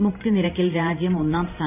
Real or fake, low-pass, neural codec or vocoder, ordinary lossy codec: fake; 3.6 kHz; vocoder, 44.1 kHz, 128 mel bands every 512 samples, BigVGAN v2; none